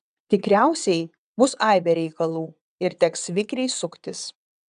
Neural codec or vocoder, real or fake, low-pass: vocoder, 22.05 kHz, 80 mel bands, WaveNeXt; fake; 9.9 kHz